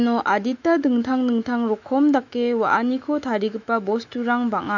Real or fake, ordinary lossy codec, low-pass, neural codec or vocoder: fake; none; 7.2 kHz; autoencoder, 48 kHz, 128 numbers a frame, DAC-VAE, trained on Japanese speech